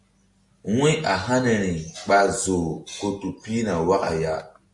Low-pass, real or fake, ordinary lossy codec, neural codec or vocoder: 10.8 kHz; real; MP3, 48 kbps; none